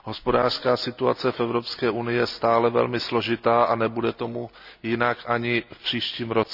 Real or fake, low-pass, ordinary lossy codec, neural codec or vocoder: real; 5.4 kHz; none; none